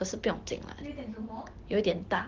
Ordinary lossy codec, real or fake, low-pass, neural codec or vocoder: Opus, 16 kbps; real; 7.2 kHz; none